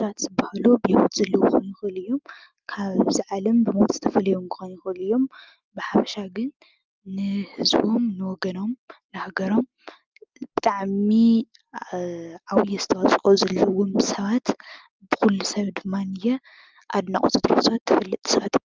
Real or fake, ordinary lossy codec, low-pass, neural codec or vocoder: real; Opus, 32 kbps; 7.2 kHz; none